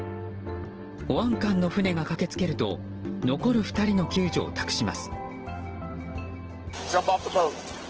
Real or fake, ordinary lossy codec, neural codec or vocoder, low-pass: real; Opus, 16 kbps; none; 7.2 kHz